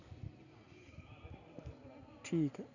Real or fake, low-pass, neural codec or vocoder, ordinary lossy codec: real; 7.2 kHz; none; none